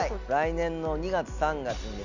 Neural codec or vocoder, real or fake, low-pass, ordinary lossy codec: none; real; 7.2 kHz; none